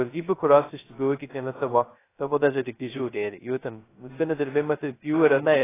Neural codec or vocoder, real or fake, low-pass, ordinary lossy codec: codec, 16 kHz, 0.2 kbps, FocalCodec; fake; 3.6 kHz; AAC, 16 kbps